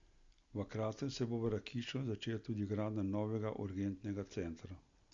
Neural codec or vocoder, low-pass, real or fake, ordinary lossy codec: none; 7.2 kHz; real; Opus, 64 kbps